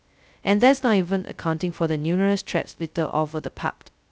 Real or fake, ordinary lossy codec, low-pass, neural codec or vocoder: fake; none; none; codec, 16 kHz, 0.2 kbps, FocalCodec